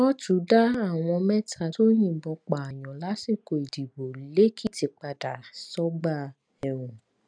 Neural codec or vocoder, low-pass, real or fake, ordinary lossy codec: none; 9.9 kHz; real; none